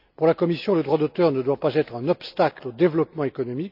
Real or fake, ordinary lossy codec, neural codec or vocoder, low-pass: real; none; none; 5.4 kHz